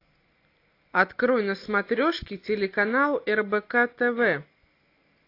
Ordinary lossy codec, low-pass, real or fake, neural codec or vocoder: AAC, 32 kbps; 5.4 kHz; real; none